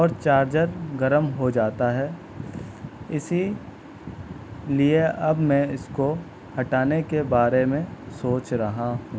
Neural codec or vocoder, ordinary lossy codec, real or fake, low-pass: none; none; real; none